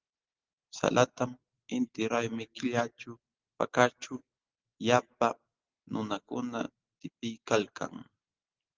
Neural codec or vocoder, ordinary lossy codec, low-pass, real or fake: none; Opus, 16 kbps; 7.2 kHz; real